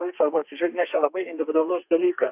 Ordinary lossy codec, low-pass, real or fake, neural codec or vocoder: AAC, 32 kbps; 3.6 kHz; fake; codec, 32 kHz, 1.9 kbps, SNAC